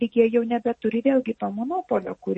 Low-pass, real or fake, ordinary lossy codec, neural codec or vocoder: 10.8 kHz; real; MP3, 32 kbps; none